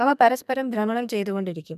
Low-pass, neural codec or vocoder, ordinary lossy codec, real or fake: 14.4 kHz; codec, 32 kHz, 1.9 kbps, SNAC; none; fake